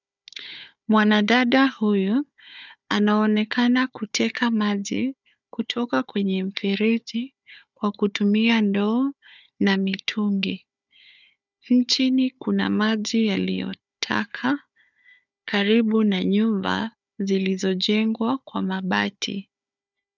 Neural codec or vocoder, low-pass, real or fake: codec, 16 kHz, 4 kbps, FunCodec, trained on Chinese and English, 50 frames a second; 7.2 kHz; fake